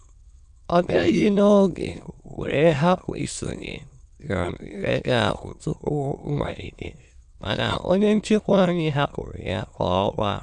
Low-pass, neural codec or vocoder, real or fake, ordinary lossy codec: 9.9 kHz; autoencoder, 22.05 kHz, a latent of 192 numbers a frame, VITS, trained on many speakers; fake; none